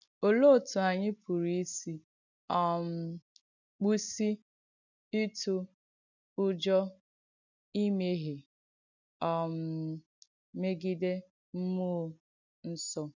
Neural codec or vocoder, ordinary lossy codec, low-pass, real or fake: none; none; 7.2 kHz; real